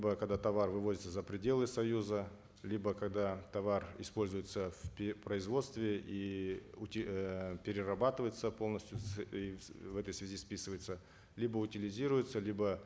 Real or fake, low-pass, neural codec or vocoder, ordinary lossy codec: real; none; none; none